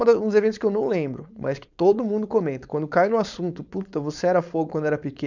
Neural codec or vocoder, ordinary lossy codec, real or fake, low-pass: codec, 16 kHz, 4.8 kbps, FACodec; none; fake; 7.2 kHz